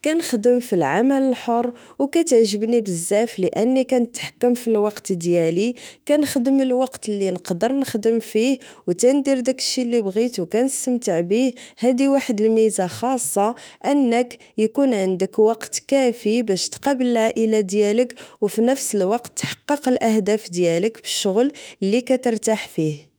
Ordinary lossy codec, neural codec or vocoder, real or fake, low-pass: none; autoencoder, 48 kHz, 32 numbers a frame, DAC-VAE, trained on Japanese speech; fake; none